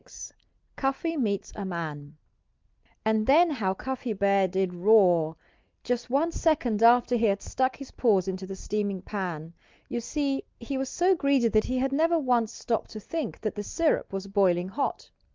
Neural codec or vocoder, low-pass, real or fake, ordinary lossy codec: none; 7.2 kHz; real; Opus, 24 kbps